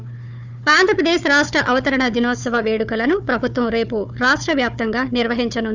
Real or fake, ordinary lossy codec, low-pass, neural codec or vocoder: fake; none; 7.2 kHz; codec, 16 kHz, 4 kbps, FunCodec, trained on Chinese and English, 50 frames a second